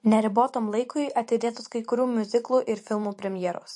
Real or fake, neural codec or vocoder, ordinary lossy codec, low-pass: fake; vocoder, 44.1 kHz, 128 mel bands every 512 samples, BigVGAN v2; MP3, 48 kbps; 10.8 kHz